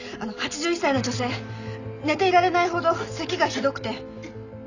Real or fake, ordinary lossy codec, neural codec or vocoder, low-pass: real; none; none; 7.2 kHz